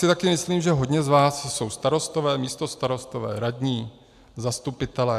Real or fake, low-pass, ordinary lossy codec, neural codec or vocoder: real; 14.4 kHz; AAC, 96 kbps; none